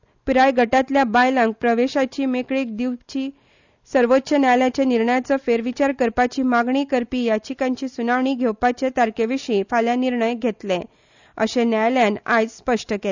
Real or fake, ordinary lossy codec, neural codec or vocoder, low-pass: real; none; none; 7.2 kHz